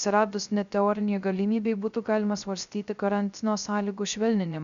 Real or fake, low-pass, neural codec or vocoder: fake; 7.2 kHz; codec, 16 kHz, 0.3 kbps, FocalCodec